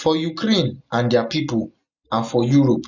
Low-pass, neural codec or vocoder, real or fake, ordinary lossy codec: 7.2 kHz; none; real; none